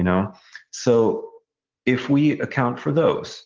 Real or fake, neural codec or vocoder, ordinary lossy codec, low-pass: real; none; Opus, 16 kbps; 7.2 kHz